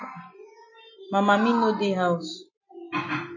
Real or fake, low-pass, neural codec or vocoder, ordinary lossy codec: real; 7.2 kHz; none; MP3, 32 kbps